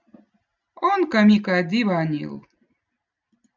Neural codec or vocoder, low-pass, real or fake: none; 7.2 kHz; real